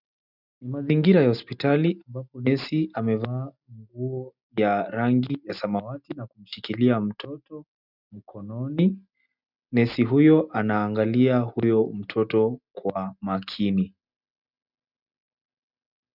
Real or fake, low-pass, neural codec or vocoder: real; 5.4 kHz; none